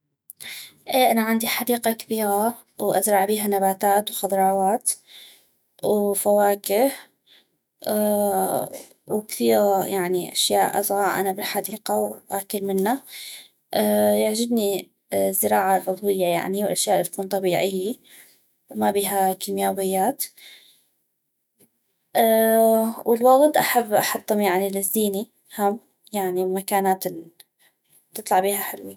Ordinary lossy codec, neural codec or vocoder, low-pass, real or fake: none; autoencoder, 48 kHz, 128 numbers a frame, DAC-VAE, trained on Japanese speech; none; fake